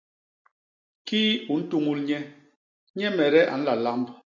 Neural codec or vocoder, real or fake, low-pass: none; real; 7.2 kHz